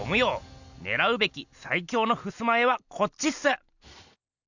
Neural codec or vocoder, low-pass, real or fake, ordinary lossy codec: none; 7.2 kHz; real; none